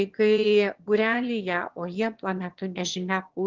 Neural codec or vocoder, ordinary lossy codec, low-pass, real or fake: autoencoder, 22.05 kHz, a latent of 192 numbers a frame, VITS, trained on one speaker; Opus, 16 kbps; 7.2 kHz; fake